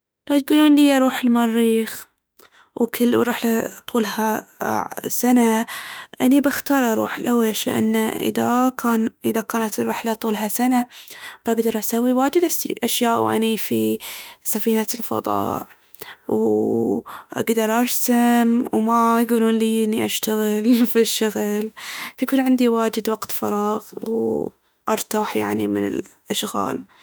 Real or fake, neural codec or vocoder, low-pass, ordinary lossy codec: fake; autoencoder, 48 kHz, 32 numbers a frame, DAC-VAE, trained on Japanese speech; none; none